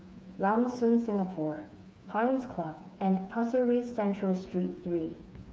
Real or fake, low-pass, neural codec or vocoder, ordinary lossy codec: fake; none; codec, 16 kHz, 4 kbps, FreqCodec, smaller model; none